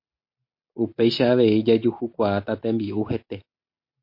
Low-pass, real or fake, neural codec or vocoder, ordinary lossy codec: 5.4 kHz; real; none; MP3, 32 kbps